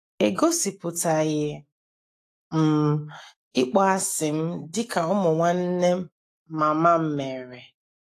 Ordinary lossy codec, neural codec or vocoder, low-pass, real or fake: AAC, 48 kbps; autoencoder, 48 kHz, 128 numbers a frame, DAC-VAE, trained on Japanese speech; 14.4 kHz; fake